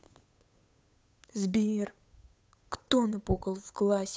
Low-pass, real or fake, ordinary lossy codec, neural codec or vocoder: none; fake; none; codec, 16 kHz, 8 kbps, FunCodec, trained on Chinese and English, 25 frames a second